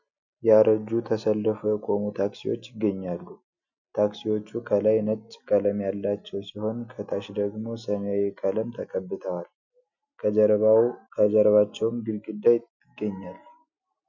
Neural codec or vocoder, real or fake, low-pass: none; real; 7.2 kHz